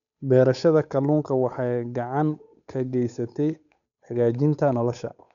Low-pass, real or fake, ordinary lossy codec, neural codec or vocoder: 7.2 kHz; fake; none; codec, 16 kHz, 8 kbps, FunCodec, trained on Chinese and English, 25 frames a second